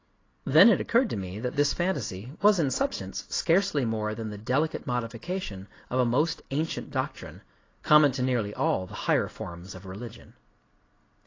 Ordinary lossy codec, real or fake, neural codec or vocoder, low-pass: AAC, 32 kbps; real; none; 7.2 kHz